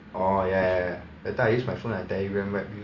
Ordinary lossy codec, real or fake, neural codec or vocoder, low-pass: none; real; none; 7.2 kHz